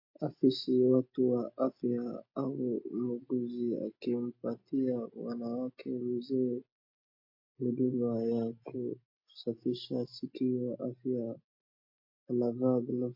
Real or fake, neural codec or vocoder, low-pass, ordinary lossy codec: real; none; 5.4 kHz; MP3, 32 kbps